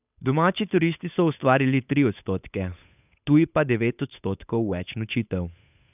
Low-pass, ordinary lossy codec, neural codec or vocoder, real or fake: 3.6 kHz; none; codec, 16 kHz, 8 kbps, FunCodec, trained on Chinese and English, 25 frames a second; fake